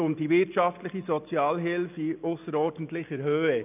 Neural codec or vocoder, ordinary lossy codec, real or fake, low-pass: none; none; real; 3.6 kHz